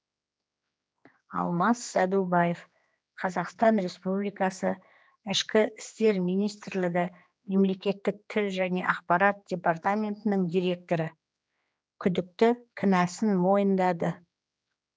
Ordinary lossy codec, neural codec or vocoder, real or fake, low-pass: none; codec, 16 kHz, 2 kbps, X-Codec, HuBERT features, trained on general audio; fake; none